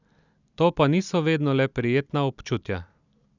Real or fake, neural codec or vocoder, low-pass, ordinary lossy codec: real; none; 7.2 kHz; none